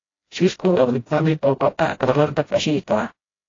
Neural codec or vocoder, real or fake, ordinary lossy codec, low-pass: codec, 16 kHz, 0.5 kbps, FreqCodec, smaller model; fake; AAC, 32 kbps; 7.2 kHz